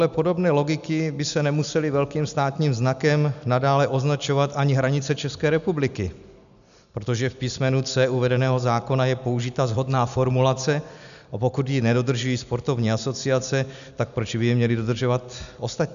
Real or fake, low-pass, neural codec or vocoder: real; 7.2 kHz; none